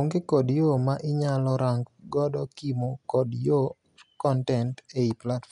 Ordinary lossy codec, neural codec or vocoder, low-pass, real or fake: none; none; 10.8 kHz; real